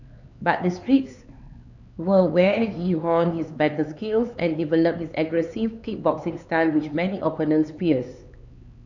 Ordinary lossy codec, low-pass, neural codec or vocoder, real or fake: none; 7.2 kHz; codec, 16 kHz, 4 kbps, X-Codec, HuBERT features, trained on LibriSpeech; fake